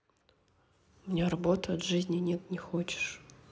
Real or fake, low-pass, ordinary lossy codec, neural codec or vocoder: real; none; none; none